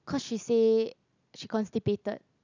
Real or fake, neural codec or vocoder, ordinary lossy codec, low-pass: real; none; none; 7.2 kHz